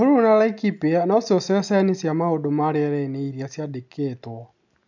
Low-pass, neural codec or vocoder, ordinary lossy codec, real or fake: 7.2 kHz; none; none; real